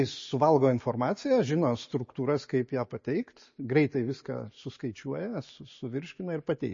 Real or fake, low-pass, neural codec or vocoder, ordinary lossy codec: real; 7.2 kHz; none; MP3, 32 kbps